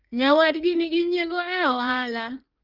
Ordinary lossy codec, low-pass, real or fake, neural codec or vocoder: Opus, 32 kbps; 5.4 kHz; fake; codec, 16 kHz in and 24 kHz out, 1.1 kbps, FireRedTTS-2 codec